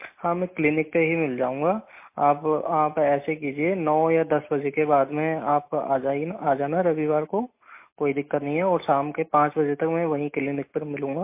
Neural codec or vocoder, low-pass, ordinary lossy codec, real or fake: none; 3.6 kHz; MP3, 24 kbps; real